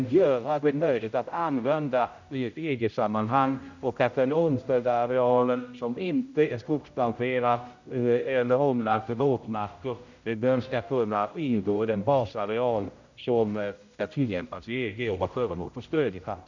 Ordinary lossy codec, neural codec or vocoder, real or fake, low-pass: none; codec, 16 kHz, 0.5 kbps, X-Codec, HuBERT features, trained on general audio; fake; 7.2 kHz